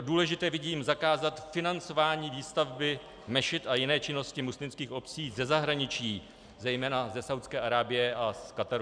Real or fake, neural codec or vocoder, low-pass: real; none; 9.9 kHz